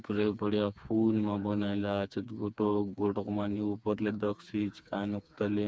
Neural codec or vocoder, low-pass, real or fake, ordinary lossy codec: codec, 16 kHz, 4 kbps, FreqCodec, smaller model; none; fake; none